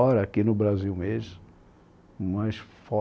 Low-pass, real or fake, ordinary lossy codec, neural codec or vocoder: none; real; none; none